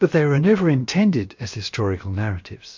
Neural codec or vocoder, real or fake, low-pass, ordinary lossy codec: codec, 16 kHz, about 1 kbps, DyCAST, with the encoder's durations; fake; 7.2 kHz; MP3, 48 kbps